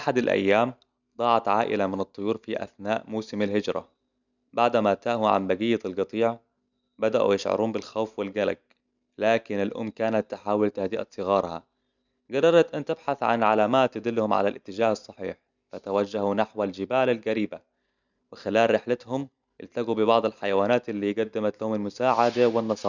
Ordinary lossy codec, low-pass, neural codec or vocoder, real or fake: none; 7.2 kHz; none; real